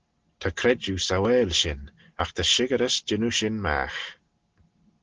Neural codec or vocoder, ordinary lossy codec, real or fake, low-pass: none; Opus, 16 kbps; real; 7.2 kHz